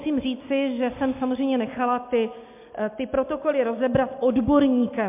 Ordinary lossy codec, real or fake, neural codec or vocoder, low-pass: MP3, 32 kbps; real; none; 3.6 kHz